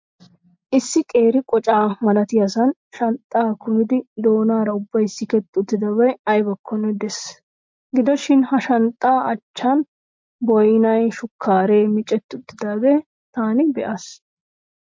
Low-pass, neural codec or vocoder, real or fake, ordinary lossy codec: 7.2 kHz; none; real; MP3, 48 kbps